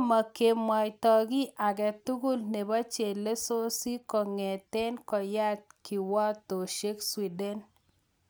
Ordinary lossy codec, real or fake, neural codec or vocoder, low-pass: none; real; none; none